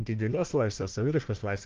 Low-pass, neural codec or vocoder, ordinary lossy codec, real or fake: 7.2 kHz; codec, 16 kHz, 1 kbps, FunCodec, trained on Chinese and English, 50 frames a second; Opus, 32 kbps; fake